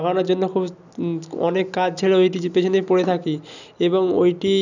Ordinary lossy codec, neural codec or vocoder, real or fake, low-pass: none; none; real; 7.2 kHz